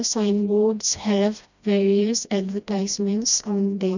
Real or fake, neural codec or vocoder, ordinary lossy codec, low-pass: fake; codec, 16 kHz, 1 kbps, FreqCodec, smaller model; none; 7.2 kHz